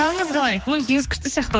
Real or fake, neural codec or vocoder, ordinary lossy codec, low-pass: fake; codec, 16 kHz, 2 kbps, X-Codec, HuBERT features, trained on general audio; none; none